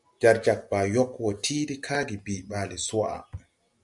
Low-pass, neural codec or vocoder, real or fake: 10.8 kHz; none; real